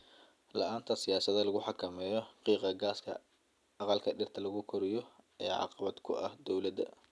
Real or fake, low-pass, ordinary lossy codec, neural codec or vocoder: real; none; none; none